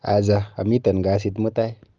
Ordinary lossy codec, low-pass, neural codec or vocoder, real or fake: Opus, 24 kbps; 7.2 kHz; none; real